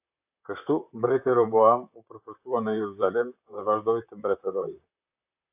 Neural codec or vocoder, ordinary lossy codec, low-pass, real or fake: vocoder, 44.1 kHz, 128 mel bands, Pupu-Vocoder; AAC, 32 kbps; 3.6 kHz; fake